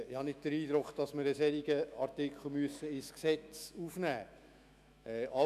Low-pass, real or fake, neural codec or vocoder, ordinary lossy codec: 14.4 kHz; fake; autoencoder, 48 kHz, 128 numbers a frame, DAC-VAE, trained on Japanese speech; none